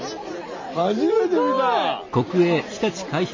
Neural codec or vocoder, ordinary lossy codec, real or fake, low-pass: none; MP3, 32 kbps; real; 7.2 kHz